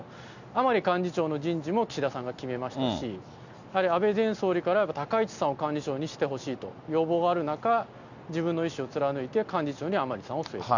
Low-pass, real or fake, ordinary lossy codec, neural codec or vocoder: 7.2 kHz; real; none; none